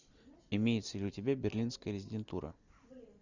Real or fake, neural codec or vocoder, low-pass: real; none; 7.2 kHz